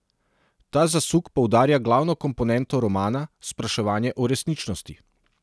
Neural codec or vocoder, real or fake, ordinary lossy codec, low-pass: none; real; none; none